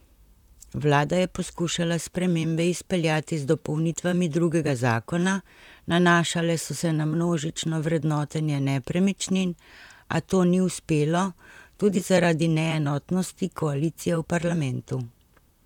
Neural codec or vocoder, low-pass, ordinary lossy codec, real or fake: vocoder, 44.1 kHz, 128 mel bands, Pupu-Vocoder; 19.8 kHz; none; fake